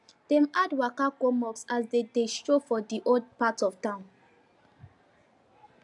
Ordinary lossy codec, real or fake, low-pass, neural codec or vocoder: AAC, 64 kbps; real; 10.8 kHz; none